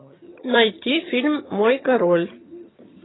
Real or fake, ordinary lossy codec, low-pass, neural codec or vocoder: fake; AAC, 16 kbps; 7.2 kHz; vocoder, 22.05 kHz, 80 mel bands, HiFi-GAN